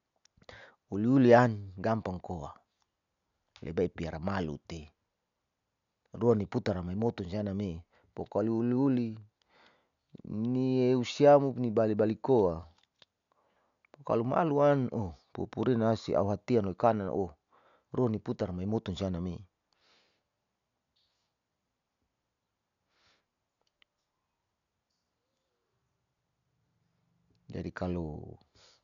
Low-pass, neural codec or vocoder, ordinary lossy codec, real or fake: 7.2 kHz; none; none; real